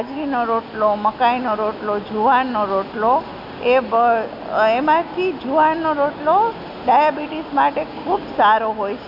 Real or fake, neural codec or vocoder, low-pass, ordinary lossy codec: real; none; 5.4 kHz; none